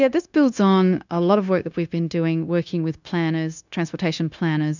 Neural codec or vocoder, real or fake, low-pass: codec, 16 kHz, 0.9 kbps, LongCat-Audio-Codec; fake; 7.2 kHz